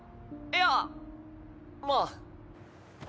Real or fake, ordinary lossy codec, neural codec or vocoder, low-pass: real; none; none; none